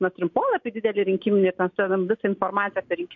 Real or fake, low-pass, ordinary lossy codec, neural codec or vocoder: real; 7.2 kHz; MP3, 48 kbps; none